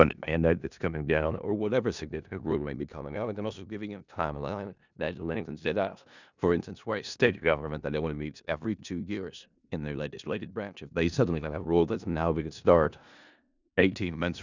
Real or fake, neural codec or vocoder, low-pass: fake; codec, 16 kHz in and 24 kHz out, 0.4 kbps, LongCat-Audio-Codec, four codebook decoder; 7.2 kHz